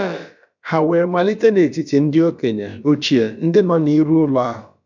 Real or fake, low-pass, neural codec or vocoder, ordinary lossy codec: fake; 7.2 kHz; codec, 16 kHz, about 1 kbps, DyCAST, with the encoder's durations; none